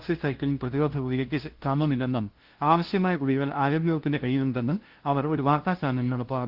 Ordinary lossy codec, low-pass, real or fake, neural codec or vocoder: Opus, 16 kbps; 5.4 kHz; fake; codec, 16 kHz, 0.5 kbps, FunCodec, trained on LibriTTS, 25 frames a second